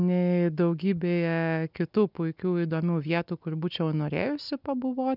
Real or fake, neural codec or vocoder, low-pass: real; none; 5.4 kHz